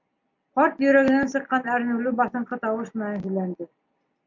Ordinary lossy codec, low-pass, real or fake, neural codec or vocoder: MP3, 48 kbps; 7.2 kHz; real; none